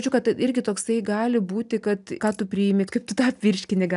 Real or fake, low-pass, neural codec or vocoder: real; 10.8 kHz; none